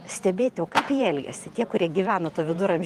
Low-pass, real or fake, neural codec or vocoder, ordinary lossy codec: 14.4 kHz; fake; vocoder, 48 kHz, 128 mel bands, Vocos; Opus, 24 kbps